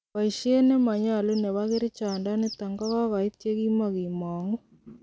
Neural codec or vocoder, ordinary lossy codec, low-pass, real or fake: none; none; none; real